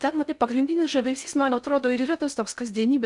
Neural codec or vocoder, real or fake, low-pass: codec, 16 kHz in and 24 kHz out, 0.6 kbps, FocalCodec, streaming, 4096 codes; fake; 10.8 kHz